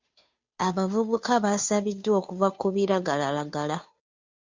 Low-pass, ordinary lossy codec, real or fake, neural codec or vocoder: 7.2 kHz; MP3, 64 kbps; fake; codec, 16 kHz, 2 kbps, FunCodec, trained on Chinese and English, 25 frames a second